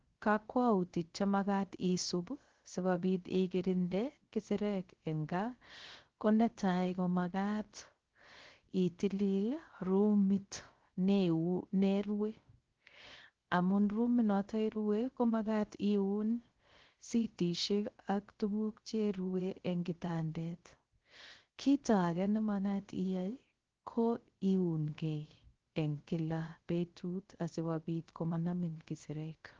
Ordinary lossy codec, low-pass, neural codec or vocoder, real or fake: Opus, 16 kbps; 7.2 kHz; codec, 16 kHz, 0.3 kbps, FocalCodec; fake